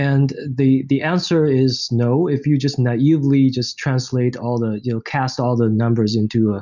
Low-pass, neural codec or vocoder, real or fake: 7.2 kHz; none; real